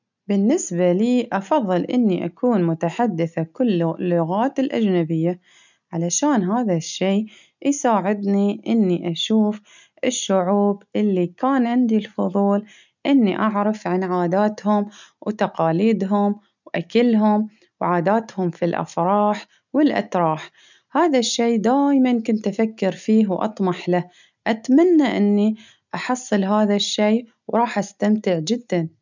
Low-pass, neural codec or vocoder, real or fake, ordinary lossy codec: 7.2 kHz; none; real; none